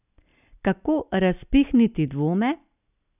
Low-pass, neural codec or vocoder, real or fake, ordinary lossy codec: 3.6 kHz; none; real; none